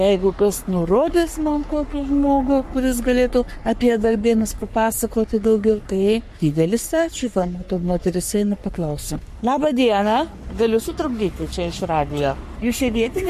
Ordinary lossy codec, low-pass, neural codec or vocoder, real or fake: MP3, 64 kbps; 14.4 kHz; codec, 44.1 kHz, 3.4 kbps, Pupu-Codec; fake